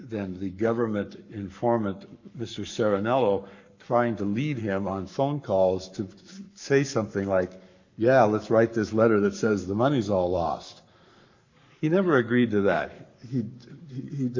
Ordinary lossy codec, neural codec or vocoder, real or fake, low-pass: MP3, 48 kbps; codec, 44.1 kHz, 7.8 kbps, Pupu-Codec; fake; 7.2 kHz